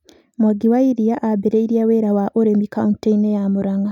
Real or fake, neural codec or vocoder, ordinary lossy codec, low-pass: real; none; none; 19.8 kHz